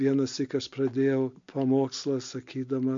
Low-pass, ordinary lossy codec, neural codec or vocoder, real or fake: 7.2 kHz; MP3, 48 kbps; none; real